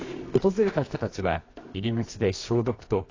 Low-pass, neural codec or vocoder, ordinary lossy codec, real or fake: 7.2 kHz; codec, 24 kHz, 0.9 kbps, WavTokenizer, medium music audio release; AAC, 32 kbps; fake